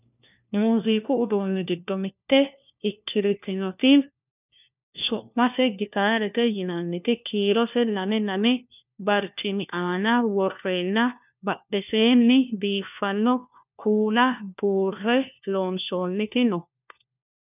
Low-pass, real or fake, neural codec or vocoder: 3.6 kHz; fake; codec, 16 kHz, 1 kbps, FunCodec, trained on LibriTTS, 50 frames a second